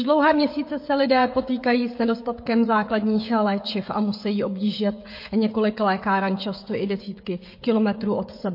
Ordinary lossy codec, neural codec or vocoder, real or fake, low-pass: MP3, 32 kbps; codec, 16 kHz, 16 kbps, FreqCodec, smaller model; fake; 5.4 kHz